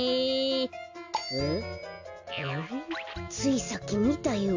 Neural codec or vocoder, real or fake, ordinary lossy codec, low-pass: none; real; AAC, 48 kbps; 7.2 kHz